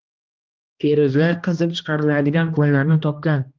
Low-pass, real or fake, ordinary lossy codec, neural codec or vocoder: 7.2 kHz; fake; Opus, 32 kbps; codec, 16 kHz, 1 kbps, X-Codec, HuBERT features, trained on balanced general audio